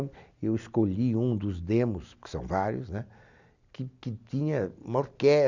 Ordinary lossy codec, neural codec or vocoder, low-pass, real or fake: none; none; 7.2 kHz; real